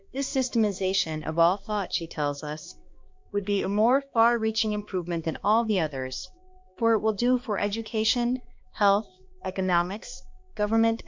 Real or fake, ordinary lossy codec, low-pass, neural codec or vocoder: fake; MP3, 64 kbps; 7.2 kHz; codec, 16 kHz, 2 kbps, X-Codec, HuBERT features, trained on balanced general audio